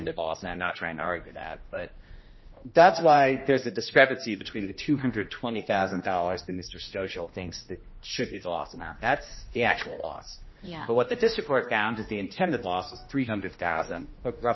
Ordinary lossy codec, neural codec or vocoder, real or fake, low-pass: MP3, 24 kbps; codec, 16 kHz, 1 kbps, X-Codec, HuBERT features, trained on general audio; fake; 7.2 kHz